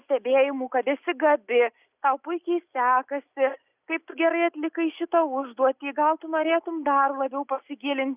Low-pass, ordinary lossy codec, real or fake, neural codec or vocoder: 3.6 kHz; Opus, 64 kbps; real; none